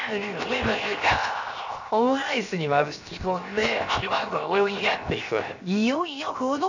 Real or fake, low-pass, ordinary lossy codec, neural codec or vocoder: fake; 7.2 kHz; none; codec, 16 kHz, 0.7 kbps, FocalCodec